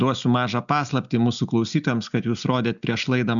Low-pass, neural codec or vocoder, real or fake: 7.2 kHz; none; real